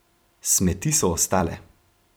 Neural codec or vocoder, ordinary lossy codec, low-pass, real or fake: vocoder, 44.1 kHz, 128 mel bands every 256 samples, BigVGAN v2; none; none; fake